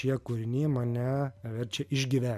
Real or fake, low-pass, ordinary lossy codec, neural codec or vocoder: real; 14.4 kHz; MP3, 96 kbps; none